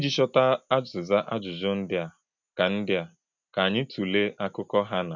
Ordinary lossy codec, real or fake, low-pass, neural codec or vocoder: none; real; 7.2 kHz; none